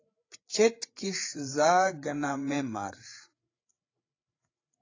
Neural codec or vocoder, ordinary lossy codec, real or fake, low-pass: codec, 16 kHz, 8 kbps, FreqCodec, larger model; AAC, 32 kbps; fake; 7.2 kHz